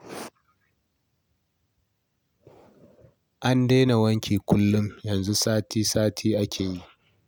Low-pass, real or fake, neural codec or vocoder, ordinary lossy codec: none; real; none; none